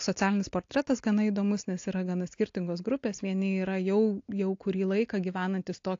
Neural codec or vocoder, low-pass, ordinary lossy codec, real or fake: none; 7.2 kHz; AAC, 48 kbps; real